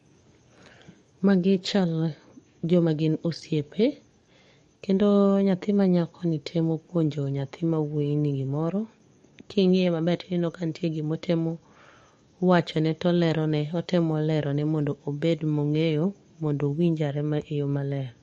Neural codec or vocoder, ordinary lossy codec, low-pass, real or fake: codec, 44.1 kHz, 7.8 kbps, DAC; MP3, 48 kbps; 19.8 kHz; fake